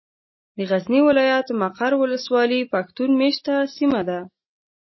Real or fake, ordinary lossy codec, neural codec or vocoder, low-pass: real; MP3, 24 kbps; none; 7.2 kHz